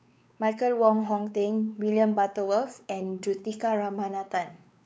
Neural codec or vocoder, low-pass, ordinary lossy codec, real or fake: codec, 16 kHz, 4 kbps, X-Codec, WavLM features, trained on Multilingual LibriSpeech; none; none; fake